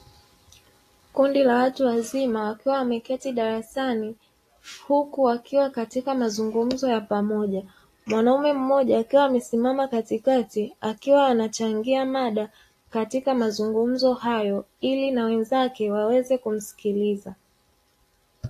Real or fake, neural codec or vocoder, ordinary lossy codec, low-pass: real; none; AAC, 48 kbps; 14.4 kHz